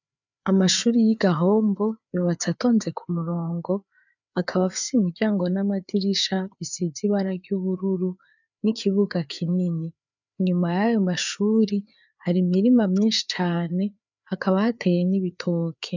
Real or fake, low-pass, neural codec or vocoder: fake; 7.2 kHz; codec, 16 kHz, 4 kbps, FreqCodec, larger model